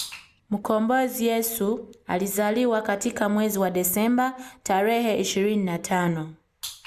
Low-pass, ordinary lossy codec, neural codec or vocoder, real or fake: 14.4 kHz; Opus, 64 kbps; none; real